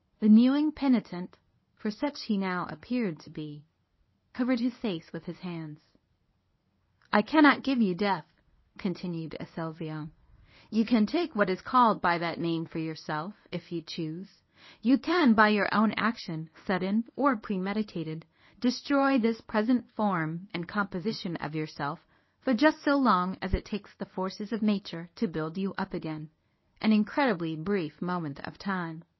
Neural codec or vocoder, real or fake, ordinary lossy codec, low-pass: codec, 24 kHz, 0.9 kbps, WavTokenizer, medium speech release version 1; fake; MP3, 24 kbps; 7.2 kHz